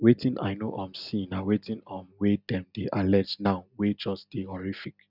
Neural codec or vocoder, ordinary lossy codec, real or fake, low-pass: none; none; real; 5.4 kHz